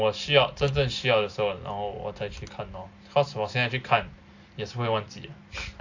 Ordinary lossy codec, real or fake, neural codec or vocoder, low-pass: AAC, 48 kbps; real; none; 7.2 kHz